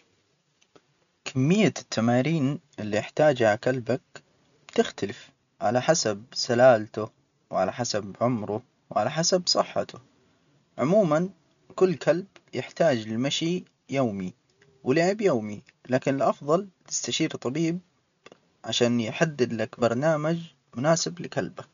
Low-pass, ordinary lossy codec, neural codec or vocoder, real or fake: 7.2 kHz; none; none; real